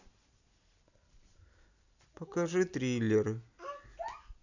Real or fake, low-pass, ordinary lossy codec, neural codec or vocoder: real; 7.2 kHz; none; none